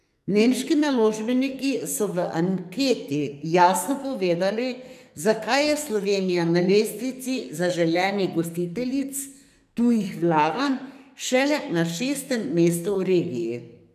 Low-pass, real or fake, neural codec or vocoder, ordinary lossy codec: 14.4 kHz; fake; codec, 44.1 kHz, 2.6 kbps, SNAC; none